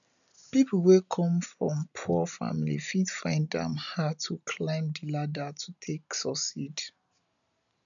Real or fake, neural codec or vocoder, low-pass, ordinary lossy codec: real; none; 7.2 kHz; none